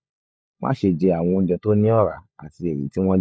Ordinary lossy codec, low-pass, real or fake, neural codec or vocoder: none; none; fake; codec, 16 kHz, 16 kbps, FunCodec, trained on LibriTTS, 50 frames a second